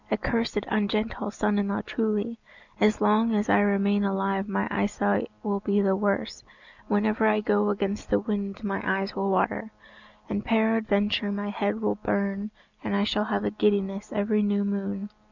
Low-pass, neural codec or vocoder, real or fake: 7.2 kHz; none; real